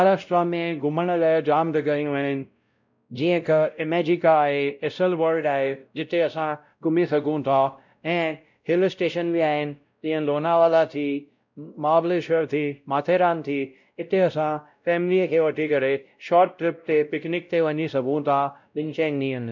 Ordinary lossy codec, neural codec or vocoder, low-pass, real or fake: none; codec, 16 kHz, 0.5 kbps, X-Codec, WavLM features, trained on Multilingual LibriSpeech; 7.2 kHz; fake